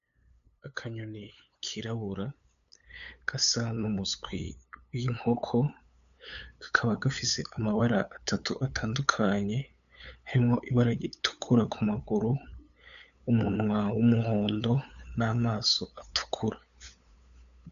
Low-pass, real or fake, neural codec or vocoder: 7.2 kHz; fake; codec, 16 kHz, 8 kbps, FunCodec, trained on LibriTTS, 25 frames a second